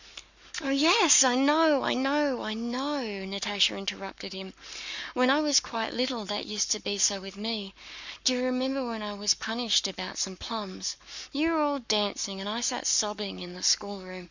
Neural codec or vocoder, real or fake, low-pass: codec, 44.1 kHz, 7.8 kbps, Pupu-Codec; fake; 7.2 kHz